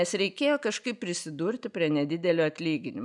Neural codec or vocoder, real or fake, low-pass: none; real; 10.8 kHz